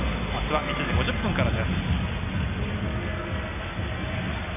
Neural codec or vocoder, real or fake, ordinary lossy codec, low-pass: none; real; none; 3.6 kHz